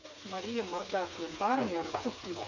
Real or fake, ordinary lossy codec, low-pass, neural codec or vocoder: fake; none; 7.2 kHz; codec, 16 kHz, 4 kbps, FreqCodec, smaller model